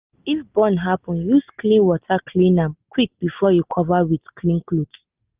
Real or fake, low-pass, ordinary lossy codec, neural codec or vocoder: real; 3.6 kHz; Opus, 24 kbps; none